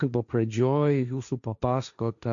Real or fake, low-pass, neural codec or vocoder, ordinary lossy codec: fake; 7.2 kHz; codec, 16 kHz, 1.1 kbps, Voila-Tokenizer; MP3, 96 kbps